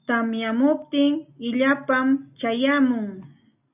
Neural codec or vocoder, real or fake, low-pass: none; real; 3.6 kHz